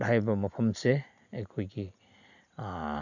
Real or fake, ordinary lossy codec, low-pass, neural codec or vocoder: real; none; 7.2 kHz; none